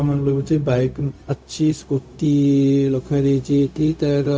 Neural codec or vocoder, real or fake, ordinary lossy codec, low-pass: codec, 16 kHz, 0.4 kbps, LongCat-Audio-Codec; fake; none; none